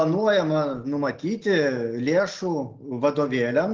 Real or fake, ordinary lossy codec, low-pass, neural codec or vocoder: real; Opus, 16 kbps; 7.2 kHz; none